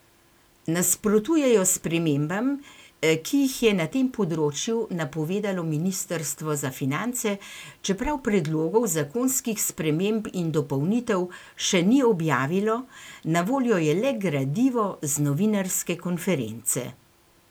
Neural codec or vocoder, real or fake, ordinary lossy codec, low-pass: none; real; none; none